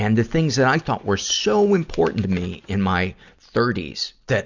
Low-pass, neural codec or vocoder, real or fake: 7.2 kHz; none; real